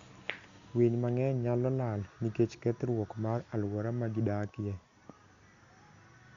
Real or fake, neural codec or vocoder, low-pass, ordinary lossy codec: real; none; 7.2 kHz; Opus, 64 kbps